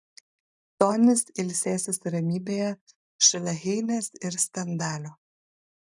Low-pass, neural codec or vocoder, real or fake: 10.8 kHz; none; real